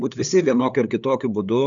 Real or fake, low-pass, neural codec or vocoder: fake; 7.2 kHz; codec, 16 kHz, 8 kbps, FunCodec, trained on LibriTTS, 25 frames a second